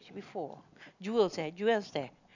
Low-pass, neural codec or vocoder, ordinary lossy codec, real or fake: 7.2 kHz; none; none; real